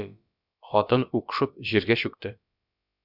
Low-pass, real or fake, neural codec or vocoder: 5.4 kHz; fake; codec, 16 kHz, about 1 kbps, DyCAST, with the encoder's durations